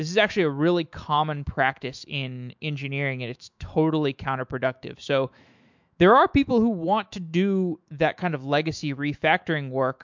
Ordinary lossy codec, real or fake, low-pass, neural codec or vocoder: MP3, 64 kbps; fake; 7.2 kHz; autoencoder, 48 kHz, 128 numbers a frame, DAC-VAE, trained on Japanese speech